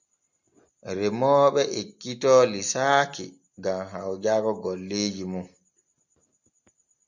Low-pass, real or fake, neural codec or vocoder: 7.2 kHz; real; none